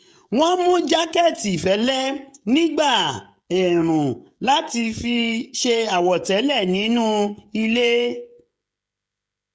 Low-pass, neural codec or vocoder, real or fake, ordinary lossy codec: none; codec, 16 kHz, 16 kbps, FreqCodec, smaller model; fake; none